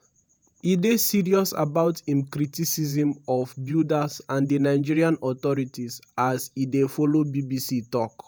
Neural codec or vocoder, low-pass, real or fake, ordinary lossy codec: vocoder, 48 kHz, 128 mel bands, Vocos; none; fake; none